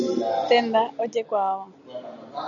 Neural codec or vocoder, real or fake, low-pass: none; real; 7.2 kHz